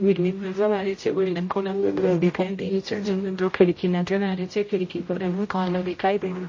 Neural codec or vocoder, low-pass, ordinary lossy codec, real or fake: codec, 16 kHz, 0.5 kbps, X-Codec, HuBERT features, trained on general audio; 7.2 kHz; MP3, 32 kbps; fake